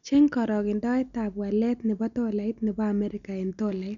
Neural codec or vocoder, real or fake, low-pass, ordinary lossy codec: none; real; 7.2 kHz; none